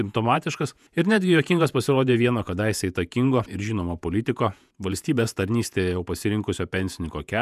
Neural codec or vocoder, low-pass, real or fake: vocoder, 48 kHz, 128 mel bands, Vocos; 14.4 kHz; fake